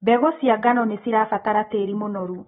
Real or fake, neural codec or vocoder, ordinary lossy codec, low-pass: real; none; AAC, 16 kbps; 7.2 kHz